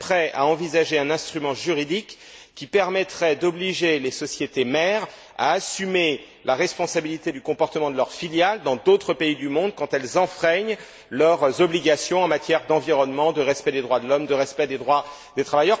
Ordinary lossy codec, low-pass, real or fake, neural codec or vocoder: none; none; real; none